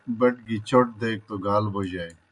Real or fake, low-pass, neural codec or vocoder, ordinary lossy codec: real; 10.8 kHz; none; MP3, 96 kbps